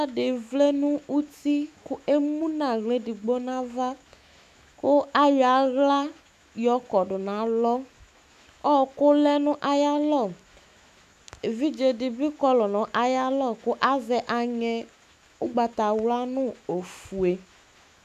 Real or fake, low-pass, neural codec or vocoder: fake; 14.4 kHz; autoencoder, 48 kHz, 128 numbers a frame, DAC-VAE, trained on Japanese speech